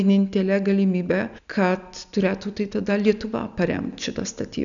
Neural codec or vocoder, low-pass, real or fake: none; 7.2 kHz; real